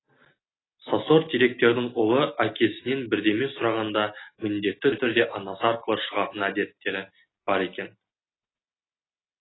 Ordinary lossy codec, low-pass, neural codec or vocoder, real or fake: AAC, 16 kbps; 7.2 kHz; none; real